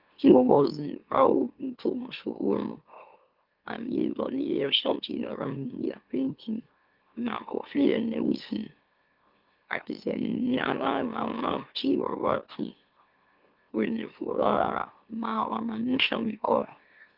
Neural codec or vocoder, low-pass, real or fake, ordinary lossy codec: autoencoder, 44.1 kHz, a latent of 192 numbers a frame, MeloTTS; 5.4 kHz; fake; Opus, 32 kbps